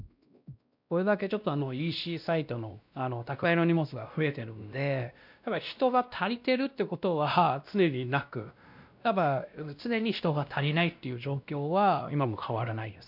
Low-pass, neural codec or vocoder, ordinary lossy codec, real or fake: 5.4 kHz; codec, 16 kHz, 1 kbps, X-Codec, WavLM features, trained on Multilingual LibriSpeech; none; fake